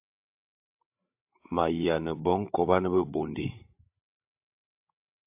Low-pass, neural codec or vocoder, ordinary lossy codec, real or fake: 3.6 kHz; codec, 16 kHz, 16 kbps, FreqCodec, larger model; AAC, 32 kbps; fake